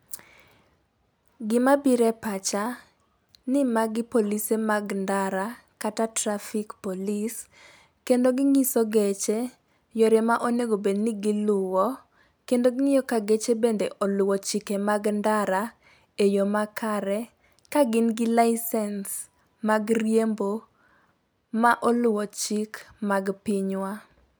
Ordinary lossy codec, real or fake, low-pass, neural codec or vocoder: none; real; none; none